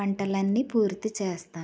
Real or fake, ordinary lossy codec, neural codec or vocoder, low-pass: real; none; none; none